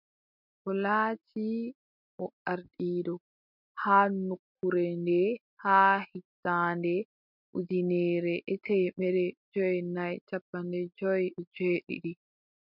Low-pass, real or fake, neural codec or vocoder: 5.4 kHz; real; none